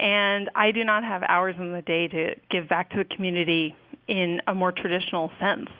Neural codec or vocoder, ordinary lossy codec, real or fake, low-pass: none; Opus, 64 kbps; real; 5.4 kHz